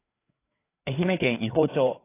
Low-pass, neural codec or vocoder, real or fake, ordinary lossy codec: 3.6 kHz; codec, 16 kHz, 4 kbps, FreqCodec, larger model; fake; AAC, 16 kbps